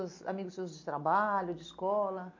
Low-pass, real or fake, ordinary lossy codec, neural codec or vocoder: 7.2 kHz; real; MP3, 48 kbps; none